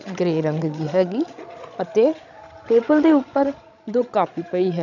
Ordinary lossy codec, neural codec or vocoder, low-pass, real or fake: none; codec, 16 kHz, 16 kbps, FreqCodec, larger model; 7.2 kHz; fake